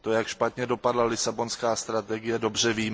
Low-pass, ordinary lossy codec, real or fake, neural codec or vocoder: none; none; real; none